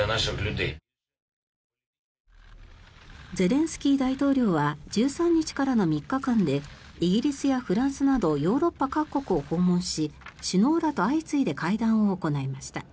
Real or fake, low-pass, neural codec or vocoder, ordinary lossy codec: real; none; none; none